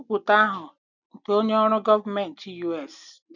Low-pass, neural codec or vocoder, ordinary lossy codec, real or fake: 7.2 kHz; none; none; real